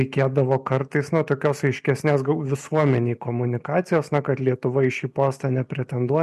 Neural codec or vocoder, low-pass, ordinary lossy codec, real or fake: none; 14.4 kHz; MP3, 64 kbps; real